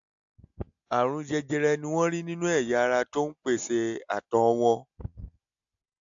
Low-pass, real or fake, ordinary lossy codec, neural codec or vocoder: 7.2 kHz; real; AAC, 64 kbps; none